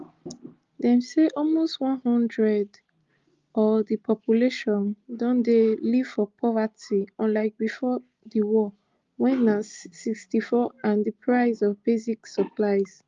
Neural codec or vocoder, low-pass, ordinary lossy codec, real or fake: none; 7.2 kHz; Opus, 32 kbps; real